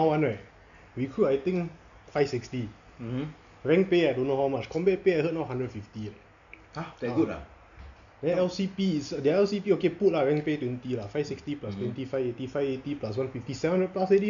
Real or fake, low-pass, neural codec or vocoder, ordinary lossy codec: real; 7.2 kHz; none; none